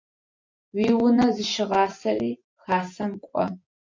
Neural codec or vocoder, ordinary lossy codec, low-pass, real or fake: vocoder, 24 kHz, 100 mel bands, Vocos; MP3, 48 kbps; 7.2 kHz; fake